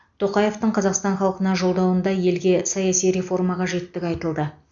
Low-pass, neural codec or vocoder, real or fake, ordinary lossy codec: 7.2 kHz; none; real; none